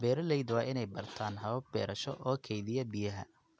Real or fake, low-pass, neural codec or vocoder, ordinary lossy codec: real; none; none; none